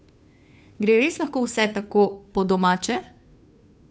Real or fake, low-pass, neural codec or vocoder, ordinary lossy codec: fake; none; codec, 16 kHz, 2 kbps, FunCodec, trained on Chinese and English, 25 frames a second; none